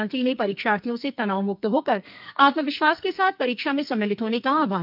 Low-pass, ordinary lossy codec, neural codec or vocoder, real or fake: 5.4 kHz; none; codec, 44.1 kHz, 2.6 kbps, SNAC; fake